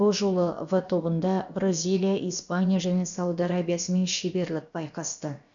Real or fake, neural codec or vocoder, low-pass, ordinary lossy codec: fake; codec, 16 kHz, about 1 kbps, DyCAST, with the encoder's durations; 7.2 kHz; MP3, 96 kbps